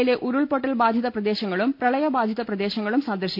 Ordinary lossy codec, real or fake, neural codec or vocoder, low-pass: MP3, 48 kbps; real; none; 5.4 kHz